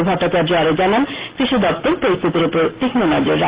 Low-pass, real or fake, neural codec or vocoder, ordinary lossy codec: 3.6 kHz; real; none; Opus, 16 kbps